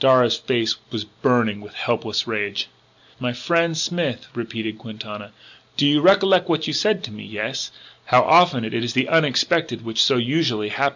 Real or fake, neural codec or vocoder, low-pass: real; none; 7.2 kHz